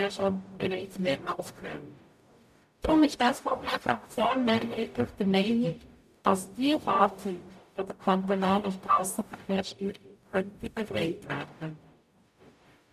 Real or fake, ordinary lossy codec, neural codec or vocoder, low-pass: fake; none; codec, 44.1 kHz, 0.9 kbps, DAC; 14.4 kHz